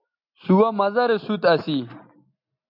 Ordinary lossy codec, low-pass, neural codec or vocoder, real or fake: MP3, 48 kbps; 5.4 kHz; none; real